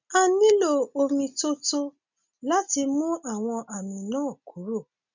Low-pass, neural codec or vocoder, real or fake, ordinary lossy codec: 7.2 kHz; none; real; none